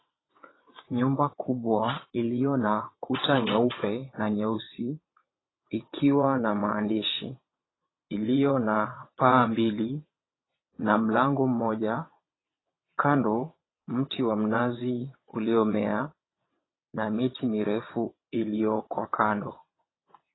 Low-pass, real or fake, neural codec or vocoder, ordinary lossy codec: 7.2 kHz; fake; vocoder, 22.05 kHz, 80 mel bands, WaveNeXt; AAC, 16 kbps